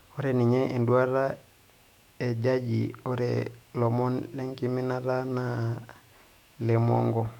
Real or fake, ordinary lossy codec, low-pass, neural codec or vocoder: fake; none; 19.8 kHz; autoencoder, 48 kHz, 128 numbers a frame, DAC-VAE, trained on Japanese speech